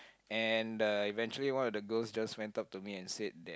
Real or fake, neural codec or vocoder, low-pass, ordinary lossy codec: real; none; none; none